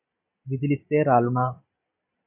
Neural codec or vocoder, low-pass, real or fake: none; 3.6 kHz; real